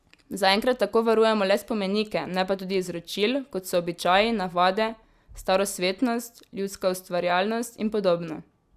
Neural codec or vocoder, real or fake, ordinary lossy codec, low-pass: none; real; Opus, 64 kbps; 14.4 kHz